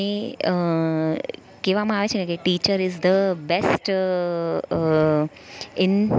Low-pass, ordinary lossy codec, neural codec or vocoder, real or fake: none; none; none; real